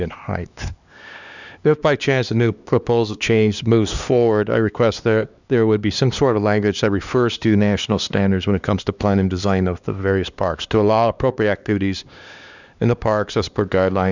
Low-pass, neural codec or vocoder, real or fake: 7.2 kHz; codec, 16 kHz, 2 kbps, X-Codec, HuBERT features, trained on LibriSpeech; fake